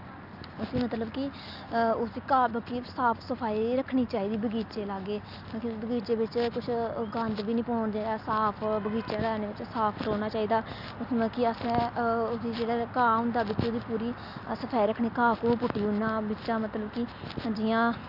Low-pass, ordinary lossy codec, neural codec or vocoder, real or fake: 5.4 kHz; none; none; real